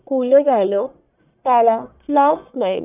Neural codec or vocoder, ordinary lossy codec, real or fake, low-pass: codec, 44.1 kHz, 1.7 kbps, Pupu-Codec; none; fake; 3.6 kHz